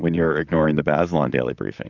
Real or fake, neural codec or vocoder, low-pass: fake; vocoder, 44.1 kHz, 128 mel bands, Pupu-Vocoder; 7.2 kHz